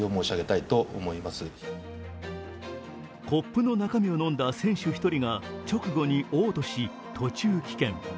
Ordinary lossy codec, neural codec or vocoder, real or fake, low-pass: none; none; real; none